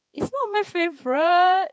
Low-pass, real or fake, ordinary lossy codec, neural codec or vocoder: none; fake; none; codec, 16 kHz, 4 kbps, X-Codec, HuBERT features, trained on balanced general audio